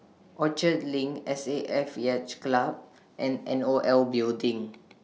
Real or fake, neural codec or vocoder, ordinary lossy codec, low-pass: real; none; none; none